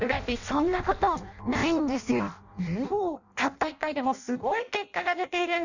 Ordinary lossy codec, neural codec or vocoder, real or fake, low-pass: none; codec, 16 kHz in and 24 kHz out, 0.6 kbps, FireRedTTS-2 codec; fake; 7.2 kHz